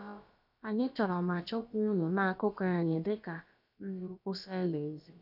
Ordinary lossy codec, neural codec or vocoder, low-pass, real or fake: none; codec, 16 kHz, about 1 kbps, DyCAST, with the encoder's durations; 5.4 kHz; fake